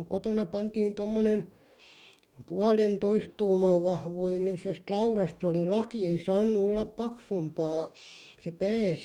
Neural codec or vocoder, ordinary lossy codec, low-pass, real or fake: codec, 44.1 kHz, 2.6 kbps, DAC; none; 19.8 kHz; fake